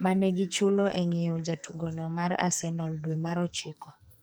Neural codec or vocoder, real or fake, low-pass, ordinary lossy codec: codec, 44.1 kHz, 2.6 kbps, SNAC; fake; none; none